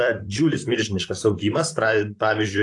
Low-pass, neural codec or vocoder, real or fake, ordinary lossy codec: 10.8 kHz; vocoder, 44.1 kHz, 128 mel bands, Pupu-Vocoder; fake; AAC, 48 kbps